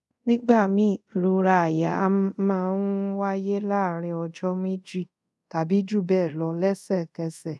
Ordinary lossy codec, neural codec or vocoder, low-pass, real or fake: none; codec, 24 kHz, 0.5 kbps, DualCodec; none; fake